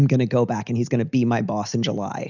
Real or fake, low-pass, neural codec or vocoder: real; 7.2 kHz; none